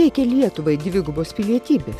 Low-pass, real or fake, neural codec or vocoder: 14.4 kHz; real; none